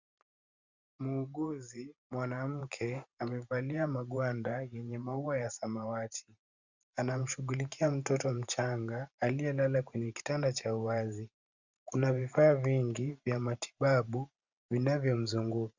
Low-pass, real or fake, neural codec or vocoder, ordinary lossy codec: 7.2 kHz; fake; vocoder, 44.1 kHz, 128 mel bands every 512 samples, BigVGAN v2; Opus, 64 kbps